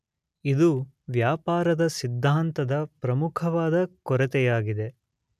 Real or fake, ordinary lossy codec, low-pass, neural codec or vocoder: real; none; 14.4 kHz; none